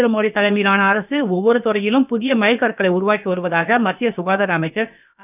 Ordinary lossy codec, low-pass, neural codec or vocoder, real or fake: none; 3.6 kHz; codec, 16 kHz, about 1 kbps, DyCAST, with the encoder's durations; fake